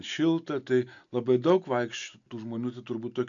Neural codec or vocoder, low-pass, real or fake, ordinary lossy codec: none; 7.2 kHz; real; AAC, 64 kbps